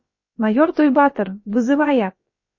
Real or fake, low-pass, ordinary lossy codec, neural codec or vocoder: fake; 7.2 kHz; MP3, 32 kbps; codec, 16 kHz, about 1 kbps, DyCAST, with the encoder's durations